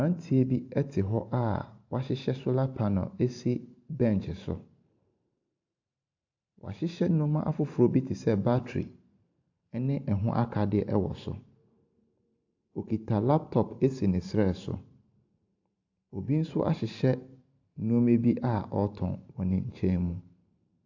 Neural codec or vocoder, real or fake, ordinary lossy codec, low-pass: vocoder, 44.1 kHz, 128 mel bands every 512 samples, BigVGAN v2; fake; AAC, 48 kbps; 7.2 kHz